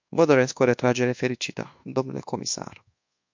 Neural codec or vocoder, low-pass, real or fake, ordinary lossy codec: codec, 24 kHz, 1.2 kbps, DualCodec; 7.2 kHz; fake; MP3, 48 kbps